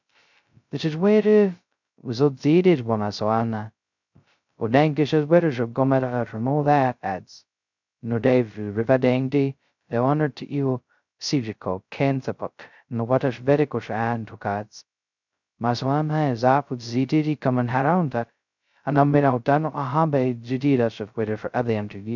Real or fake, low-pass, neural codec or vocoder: fake; 7.2 kHz; codec, 16 kHz, 0.2 kbps, FocalCodec